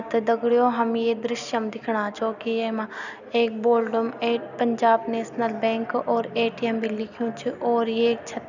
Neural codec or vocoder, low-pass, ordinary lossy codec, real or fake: none; 7.2 kHz; none; real